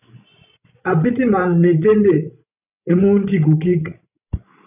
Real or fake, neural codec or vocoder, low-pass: fake; codec, 16 kHz, 16 kbps, FreqCodec, larger model; 3.6 kHz